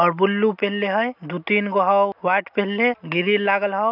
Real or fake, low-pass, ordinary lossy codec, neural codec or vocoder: real; 5.4 kHz; none; none